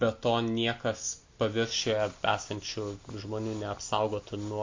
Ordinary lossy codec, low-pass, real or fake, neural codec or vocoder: MP3, 48 kbps; 7.2 kHz; real; none